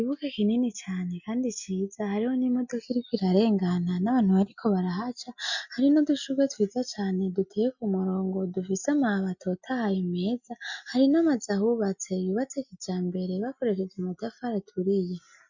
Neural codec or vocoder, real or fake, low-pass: none; real; 7.2 kHz